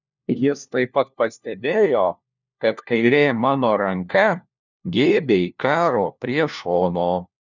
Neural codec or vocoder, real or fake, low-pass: codec, 16 kHz, 1 kbps, FunCodec, trained on LibriTTS, 50 frames a second; fake; 7.2 kHz